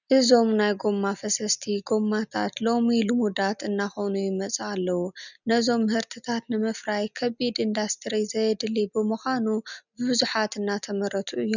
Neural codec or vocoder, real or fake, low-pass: none; real; 7.2 kHz